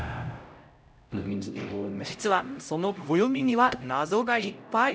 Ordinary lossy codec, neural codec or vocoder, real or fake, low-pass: none; codec, 16 kHz, 0.5 kbps, X-Codec, HuBERT features, trained on LibriSpeech; fake; none